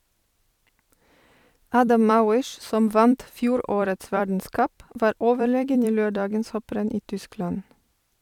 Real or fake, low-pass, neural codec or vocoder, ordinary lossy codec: fake; 19.8 kHz; vocoder, 44.1 kHz, 128 mel bands every 256 samples, BigVGAN v2; none